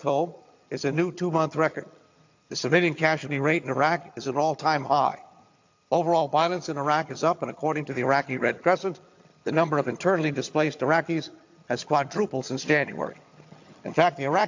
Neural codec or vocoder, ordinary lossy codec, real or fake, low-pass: vocoder, 22.05 kHz, 80 mel bands, HiFi-GAN; AAC, 48 kbps; fake; 7.2 kHz